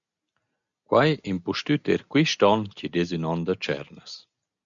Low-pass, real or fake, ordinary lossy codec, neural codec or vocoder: 7.2 kHz; real; MP3, 96 kbps; none